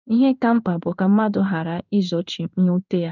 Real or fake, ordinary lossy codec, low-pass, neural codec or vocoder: fake; none; 7.2 kHz; codec, 16 kHz in and 24 kHz out, 1 kbps, XY-Tokenizer